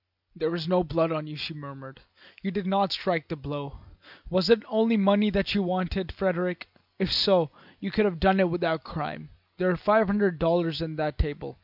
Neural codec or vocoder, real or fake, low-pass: none; real; 5.4 kHz